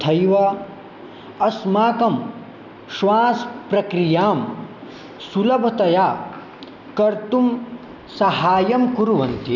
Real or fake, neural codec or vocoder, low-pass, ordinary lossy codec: real; none; 7.2 kHz; none